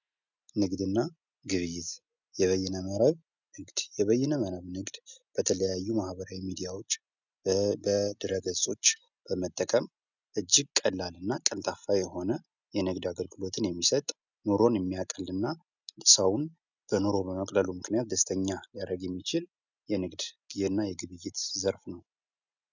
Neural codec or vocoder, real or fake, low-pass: none; real; 7.2 kHz